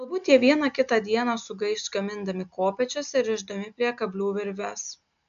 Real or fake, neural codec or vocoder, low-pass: real; none; 7.2 kHz